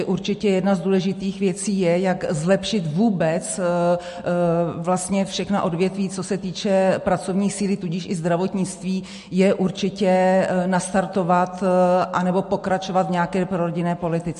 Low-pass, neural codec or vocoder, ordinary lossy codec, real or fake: 14.4 kHz; none; MP3, 48 kbps; real